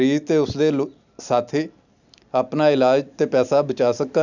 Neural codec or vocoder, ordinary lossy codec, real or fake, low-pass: none; none; real; 7.2 kHz